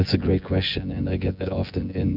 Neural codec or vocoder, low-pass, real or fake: vocoder, 24 kHz, 100 mel bands, Vocos; 5.4 kHz; fake